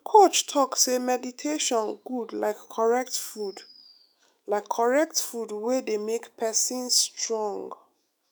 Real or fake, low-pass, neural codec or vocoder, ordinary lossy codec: fake; none; autoencoder, 48 kHz, 128 numbers a frame, DAC-VAE, trained on Japanese speech; none